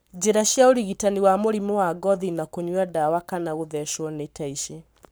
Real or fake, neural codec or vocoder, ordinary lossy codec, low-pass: fake; codec, 44.1 kHz, 7.8 kbps, Pupu-Codec; none; none